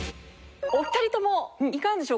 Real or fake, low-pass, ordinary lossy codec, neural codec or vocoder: real; none; none; none